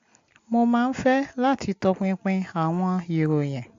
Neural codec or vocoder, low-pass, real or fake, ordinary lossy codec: none; 7.2 kHz; real; AAC, 48 kbps